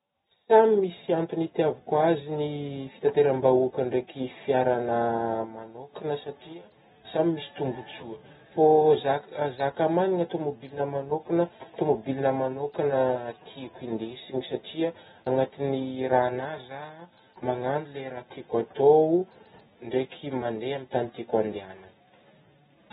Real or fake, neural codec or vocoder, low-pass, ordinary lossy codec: real; none; 19.8 kHz; AAC, 16 kbps